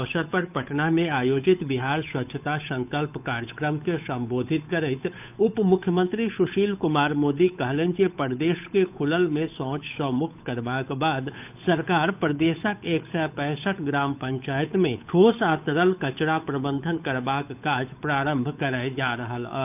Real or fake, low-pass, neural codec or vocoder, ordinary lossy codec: fake; 3.6 kHz; codec, 16 kHz, 8 kbps, FunCodec, trained on Chinese and English, 25 frames a second; none